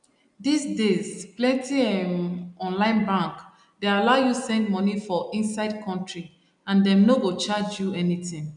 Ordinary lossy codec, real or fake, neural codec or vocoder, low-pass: none; real; none; 9.9 kHz